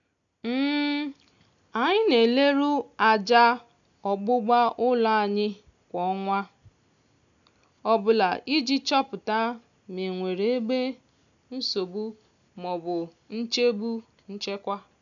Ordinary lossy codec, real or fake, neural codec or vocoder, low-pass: none; real; none; 7.2 kHz